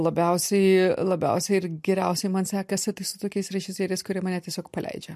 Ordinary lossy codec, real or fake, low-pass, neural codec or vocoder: MP3, 64 kbps; real; 14.4 kHz; none